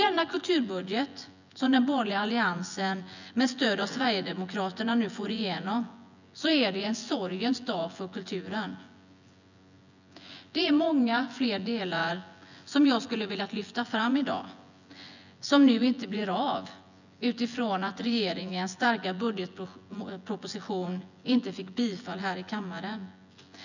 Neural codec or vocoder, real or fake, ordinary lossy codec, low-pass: vocoder, 24 kHz, 100 mel bands, Vocos; fake; none; 7.2 kHz